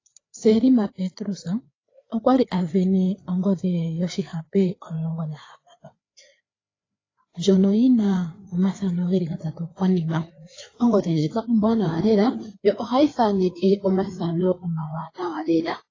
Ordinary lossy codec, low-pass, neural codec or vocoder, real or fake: AAC, 32 kbps; 7.2 kHz; codec, 16 kHz, 4 kbps, FreqCodec, larger model; fake